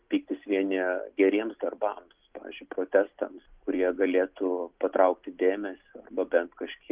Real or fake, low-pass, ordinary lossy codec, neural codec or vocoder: real; 3.6 kHz; Opus, 24 kbps; none